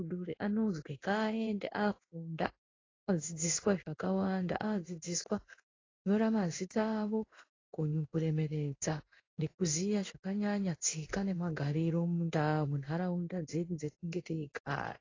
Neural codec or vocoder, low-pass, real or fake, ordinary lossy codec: codec, 16 kHz in and 24 kHz out, 1 kbps, XY-Tokenizer; 7.2 kHz; fake; AAC, 32 kbps